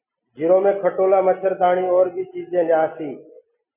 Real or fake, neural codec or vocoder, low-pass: real; none; 3.6 kHz